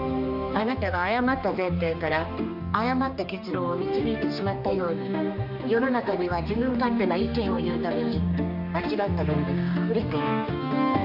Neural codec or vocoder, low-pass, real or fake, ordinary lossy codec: codec, 16 kHz, 2 kbps, X-Codec, HuBERT features, trained on general audio; 5.4 kHz; fake; MP3, 48 kbps